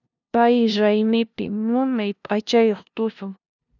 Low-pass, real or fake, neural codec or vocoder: 7.2 kHz; fake; codec, 16 kHz, 0.5 kbps, FunCodec, trained on LibriTTS, 25 frames a second